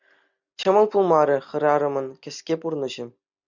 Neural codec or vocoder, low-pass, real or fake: none; 7.2 kHz; real